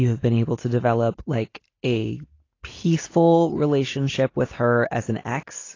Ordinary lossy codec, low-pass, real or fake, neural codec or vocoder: AAC, 32 kbps; 7.2 kHz; real; none